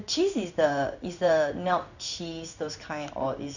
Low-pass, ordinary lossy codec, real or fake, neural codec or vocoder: 7.2 kHz; none; fake; codec, 16 kHz in and 24 kHz out, 1 kbps, XY-Tokenizer